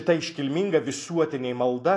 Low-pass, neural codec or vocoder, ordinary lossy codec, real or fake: 10.8 kHz; none; AAC, 48 kbps; real